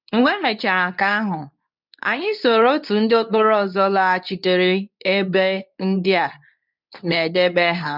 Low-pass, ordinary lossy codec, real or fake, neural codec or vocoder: 5.4 kHz; none; fake; codec, 24 kHz, 0.9 kbps, WavTokenizer, medium speech release version 2